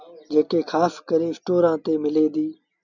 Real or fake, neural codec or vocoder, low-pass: real; none; 7.2 kHz